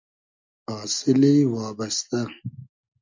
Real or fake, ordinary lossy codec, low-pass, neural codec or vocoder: real; MP3, 64 kbps; 7.2 kHz; none